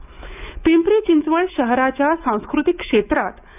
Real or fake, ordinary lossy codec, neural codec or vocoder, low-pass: fake; none; autoencoder, 48 kHz, 128 numbers a frame, DAC-VAE, trained on Japanese speech; 3.6 kHz